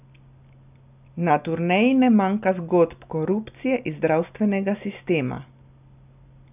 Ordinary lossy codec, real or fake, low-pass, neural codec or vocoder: none; real; 3.6 kHz; none